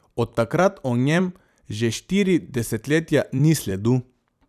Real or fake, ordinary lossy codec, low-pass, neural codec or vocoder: fake; none; 14.4 kHz; vocoder, 44.1 kHz, 128 mel bands every 256 samples, BigVGAN v2